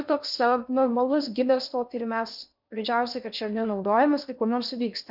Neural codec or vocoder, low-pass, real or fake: codec, 16 kHz in and 24 kHz out, 0.6 kbps, FocalCodec, streaming, 2048 codes; 5.4 kHz; fake